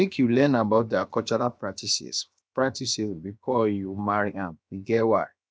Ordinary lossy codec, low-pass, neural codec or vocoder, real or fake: none; none; codec, 16 kHz, 0.7 kbps, FocalCodec; fake